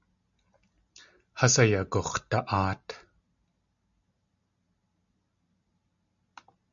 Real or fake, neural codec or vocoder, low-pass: real; none; 7.2 kHz